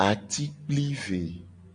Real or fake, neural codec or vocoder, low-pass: real; none; 9.9 kHz